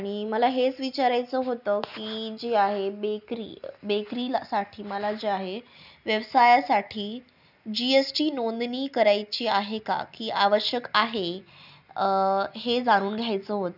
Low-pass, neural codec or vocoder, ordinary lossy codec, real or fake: 5.4 kHz; none; none; real